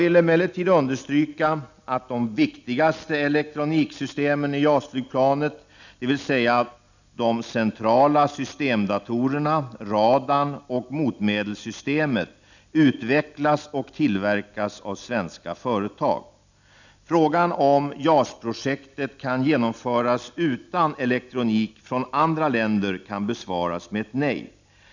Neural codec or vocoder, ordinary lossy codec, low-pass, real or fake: none; none; 7.2 kHz; real